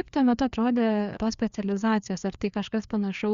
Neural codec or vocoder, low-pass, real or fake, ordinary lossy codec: codec, 16 kHz, 2 kbps, FreqCodec, larger model; 7.2 kHz; fake; Opus, 64 kbps